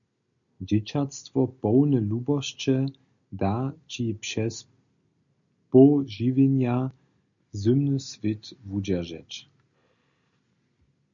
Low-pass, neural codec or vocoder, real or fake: 7.2 kHz; none; real